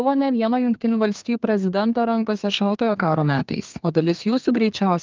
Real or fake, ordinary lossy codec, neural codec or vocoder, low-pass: fake; Opus, 24 kbps; codec, 32 kHz, 1.9 kbps, SNAC; 7.2 kHz